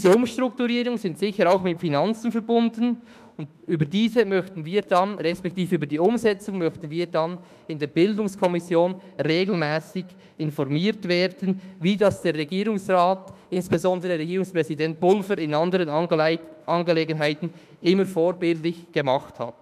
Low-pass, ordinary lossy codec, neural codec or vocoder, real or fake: 14.4 kHz; none; autoencoder, 48 kHz, 32 numbers a frame, DAC-VAE, trained on Japanese speech; fake